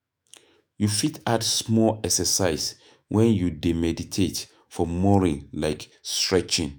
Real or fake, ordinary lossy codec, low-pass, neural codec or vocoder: fake; none; none; autoencoder, 48 kHz, 128 numbers a frame, DAC-VAE, trained on Japanese speech